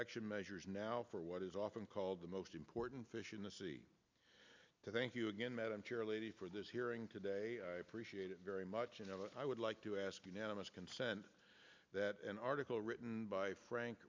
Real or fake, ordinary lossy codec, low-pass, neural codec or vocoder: real; MP3, 64 kbps; 7.2 kHz; none